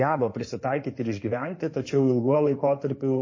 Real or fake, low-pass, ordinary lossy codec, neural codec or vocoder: fake; 7.2 kHz; MP3, 32 kbps; codec, 16 kHz in and 24 kHz out, 2.2 kbps, FireRedTTS-2 codec